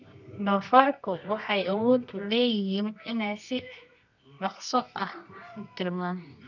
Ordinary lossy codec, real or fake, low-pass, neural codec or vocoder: none; fake; 7.2 kHz; codec, 24 kHz, 0.9 kbps, WavTokenizer, medium music audio release